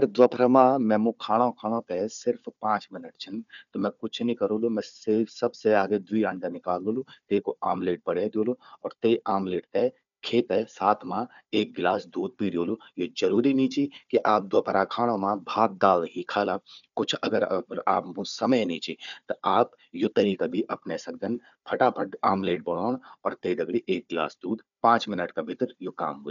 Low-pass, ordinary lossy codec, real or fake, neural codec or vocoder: 7.2 kHz; none; fake; codec, 16 kHz, 4 kbps, FunCodec, trained on Chinese and English, 50 frames a second